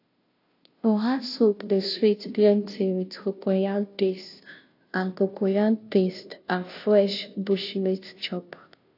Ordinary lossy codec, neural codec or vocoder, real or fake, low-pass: AAC, 32 kbps; codec, 16 kHz, 0.5 kbps, FunCodec, trained on Chinese and English, 25 frames a second; fake; 5.4 kHz